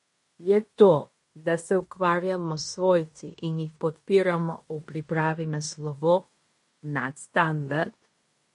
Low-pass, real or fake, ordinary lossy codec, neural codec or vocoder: 10.8 kHz; fake; MP3, 48 kbps; codec, 16 kHz in and 24 kHz out, 0.9 kbps, LongCat-Audio-Codec, fine tuned four codebook decoder